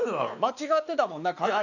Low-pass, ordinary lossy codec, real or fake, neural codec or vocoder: 7.2 kHz; none; fake; codec, 16 kHz, 2 kbps, X-Codec, WavLM features, trained on Multilingual LibriSpeech